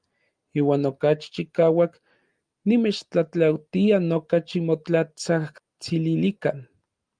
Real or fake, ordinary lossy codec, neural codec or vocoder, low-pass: real; Opus, 32 kbps; none; 9.9 kHz